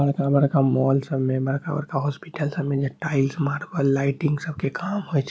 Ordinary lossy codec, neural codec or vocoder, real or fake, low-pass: none; none; real; none